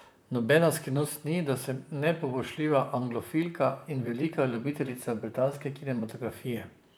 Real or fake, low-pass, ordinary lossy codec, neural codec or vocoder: fake; none; none; vocoder, 44.1 kHz, 128 mel bands, Pupu-Vocoder